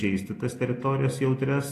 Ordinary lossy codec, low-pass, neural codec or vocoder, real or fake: AAC, 48 kbps; 14.4 kHz; none; real